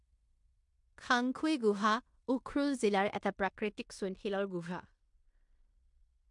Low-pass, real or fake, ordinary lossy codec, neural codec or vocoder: 10.8 kHz; fake; MP3, 64 kbps; codec, 16 kHz in and 24 kHz out, 0.9 kbps, LongCat-Audio-Codec, fine tuned four codebook decoder